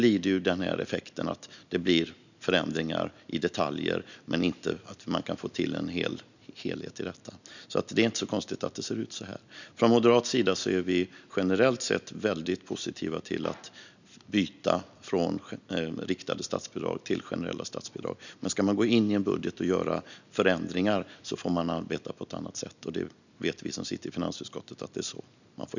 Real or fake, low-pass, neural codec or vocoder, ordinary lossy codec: real; 7.2 kHz; none; none